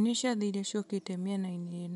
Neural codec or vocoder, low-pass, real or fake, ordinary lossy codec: none; 10.8 kHz; real; MP3, 96 kbps